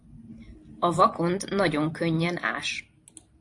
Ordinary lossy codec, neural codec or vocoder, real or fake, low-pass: AAC, 48 kbps; vocoder, 44.1 kHz, 128 mel bands every 512 samples, BigVGAN v2; fake; 10.8 kHz